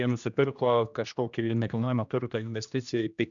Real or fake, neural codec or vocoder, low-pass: fake; codec, 16 kHz, 1 kbps, X-Codec, HuBERT features, trained on general audio; 7.2 kHz